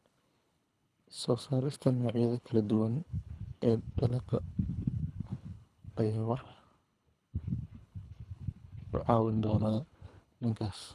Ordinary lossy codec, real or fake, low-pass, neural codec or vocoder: none; fake; none; codec, 24 kHz, 3 kbps, HILCodec